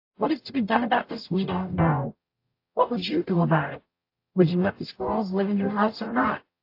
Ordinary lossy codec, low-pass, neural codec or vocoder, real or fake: AAC, 32 kbps; 5.4 kHz; codec, 44.1 kHz, 0.9 kbps, DAC; fake